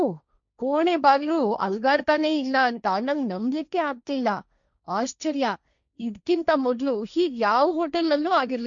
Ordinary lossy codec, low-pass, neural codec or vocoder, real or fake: MP3, 96 kbps; 7.2 kHz; codec, 16 kHz, 1.1 kbps, Voila-Tokenizer; fake